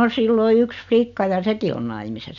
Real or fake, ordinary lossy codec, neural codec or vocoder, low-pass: real; none; none; 7.2 kHz